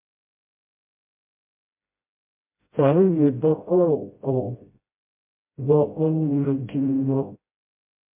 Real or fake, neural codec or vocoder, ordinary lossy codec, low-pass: fake; codec, 16 kHz, 0.5 kbps, FreqCodec, smaller model; MP3, 24 kbps; 3.6 kHz